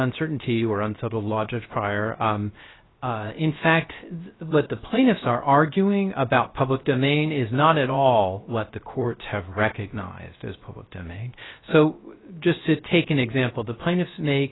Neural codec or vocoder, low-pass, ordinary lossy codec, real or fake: codec, 16 kHz, 0.3 kbps, FocalCodec; 7.2 kHz; AAC, 16 kbps; fake